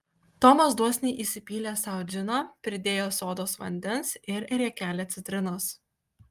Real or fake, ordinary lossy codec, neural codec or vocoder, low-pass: real; Opus, 32 kbps; none; 14.4 kHz